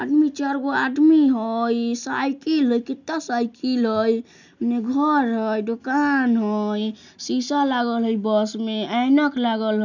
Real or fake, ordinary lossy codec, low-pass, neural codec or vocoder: real; none; 7.2 kHz; none